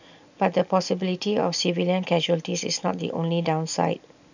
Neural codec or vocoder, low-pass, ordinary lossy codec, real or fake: none; 7.2 kHz; none; real